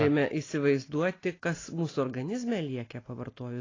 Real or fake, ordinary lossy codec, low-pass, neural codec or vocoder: real; AAC, 32 kbps; 7.2 kHz; none